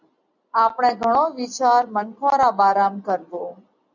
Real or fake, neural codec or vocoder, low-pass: real; none; 7.2 kHz